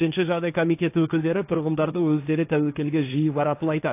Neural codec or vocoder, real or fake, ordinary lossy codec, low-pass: codec, 16 kHz, 1.1 kbps, Voila-Tokenizer; fake; AAC, 24 kbps; 3.6 kHz